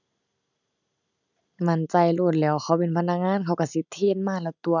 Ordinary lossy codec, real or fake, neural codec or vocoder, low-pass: none; real; none; 7.2 kHz